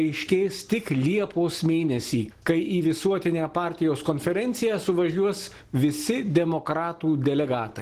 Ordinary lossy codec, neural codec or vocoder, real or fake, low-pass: Opus, 16 kbps; none; real; 14.4 kHz